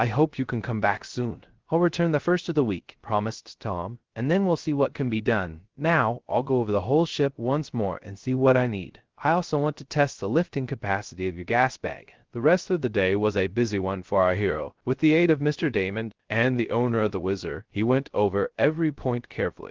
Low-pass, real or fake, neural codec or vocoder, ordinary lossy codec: 7.2 kHz; fake; codec, 16 kHz, 0.3 kbps, FocalCodec; Opus, 16 kbps